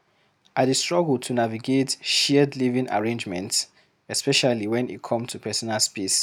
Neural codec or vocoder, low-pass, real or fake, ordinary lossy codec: none; 19.8 kHz; real; none